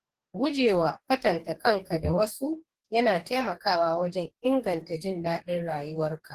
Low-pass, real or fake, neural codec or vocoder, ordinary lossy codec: 14.4 kHz; fake; codec, 44.1 kHz, 2.6 kbps, DAC; Opus, 16 kbps